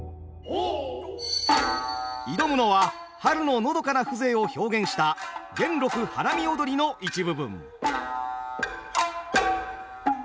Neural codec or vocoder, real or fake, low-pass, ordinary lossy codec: none; real; none; none